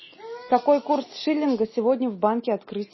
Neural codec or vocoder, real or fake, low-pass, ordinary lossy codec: none; real; 7.2 kHz; MP3, 24 kbps